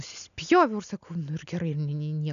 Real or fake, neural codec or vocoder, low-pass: real; none; 7.2 kHz